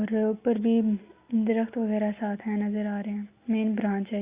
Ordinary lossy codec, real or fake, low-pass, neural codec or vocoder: Opus, 64 kbps; real; 3.6 kHz; none